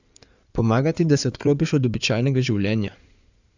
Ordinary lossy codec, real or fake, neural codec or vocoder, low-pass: none; fake; codec, 16 kHz in and 24 kHz out, 2.2 kbps, FireRedTTS-2 codec; 7.2 kHz